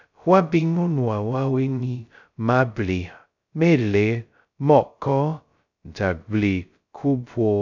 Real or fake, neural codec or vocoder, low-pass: fake; codec, 16 kHz, 0.2 kbps, FocalCodec; 7.2 kHz